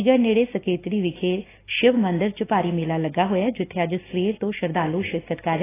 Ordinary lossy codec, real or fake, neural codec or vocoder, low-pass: AAC, 16 kbps; real; none; 3.6 kHz